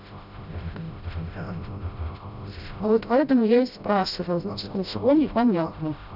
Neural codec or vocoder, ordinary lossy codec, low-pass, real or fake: codec, 16 kHz, 0.5 kbps, FreqCodec, smaller model; none; 5.4 kHz; fake